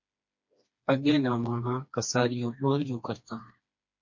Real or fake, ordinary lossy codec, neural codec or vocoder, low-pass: fake; MP3, 48 kbps; codec, 16 kHz, 2 kbps, FreqCodec, smaller model; 7.2 kHz